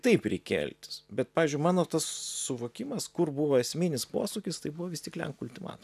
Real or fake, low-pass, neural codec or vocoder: real; 14.4 kHz; none